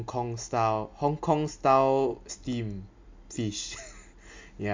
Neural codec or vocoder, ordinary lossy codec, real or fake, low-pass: none; MP3, 64 kbps; real; 7.2 kHz